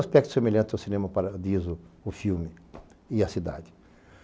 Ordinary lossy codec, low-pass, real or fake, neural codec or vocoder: none; none; real; none